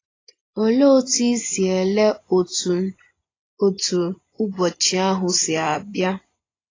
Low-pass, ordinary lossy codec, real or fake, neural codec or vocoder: 7.2 kHz; AAC, 32 kbps; fake; vocoder, 24 kHz, 100 mel bands, Vocos